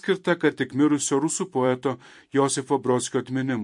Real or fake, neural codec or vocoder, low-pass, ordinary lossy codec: fake; vocoder, 44.1 kHz, 128 mel bands every 256 samples, BigVGAN v2; 10.8 kHz; MP3, 48 kbps